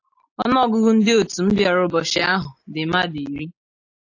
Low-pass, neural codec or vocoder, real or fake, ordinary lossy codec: 7.2 kHz; none; real; AAC, 48 kbps